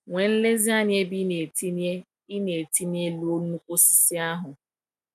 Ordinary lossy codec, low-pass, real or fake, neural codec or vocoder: none; 14.4 kHz; real; none